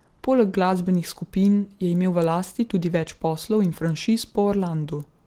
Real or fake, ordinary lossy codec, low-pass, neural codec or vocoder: real; Opus, 16 kbps; 19.8 kHz; none